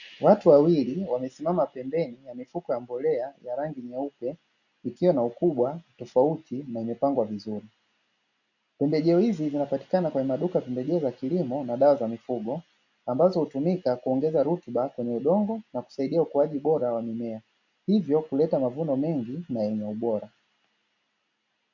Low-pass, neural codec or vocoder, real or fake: 7.2 kHz; none; real